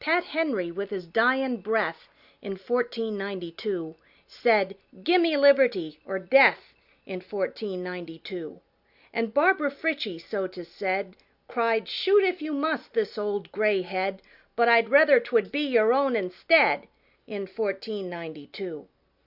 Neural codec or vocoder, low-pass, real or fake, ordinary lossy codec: none; 5.4 kHz; real; Opus, 64 kbps